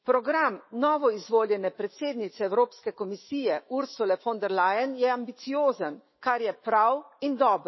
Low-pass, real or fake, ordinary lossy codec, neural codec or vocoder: 7.2 kHz; fake; MP3, 24 kbps; autoencoder, 48 kHz, 128 numbers a frame, DAC-VAE, trained on Japanese speech